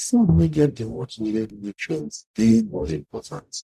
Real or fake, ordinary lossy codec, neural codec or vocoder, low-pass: fake; none; codec, 44.1 kHz, 0.9 kbps, DAC; 14.4 kHz